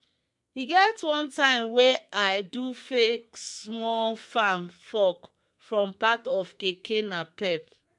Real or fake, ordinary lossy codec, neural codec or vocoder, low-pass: fake; MP3, 64 kbps; codec, 32 kHz, 1.9 kbps, SNAC; 10.8 kHz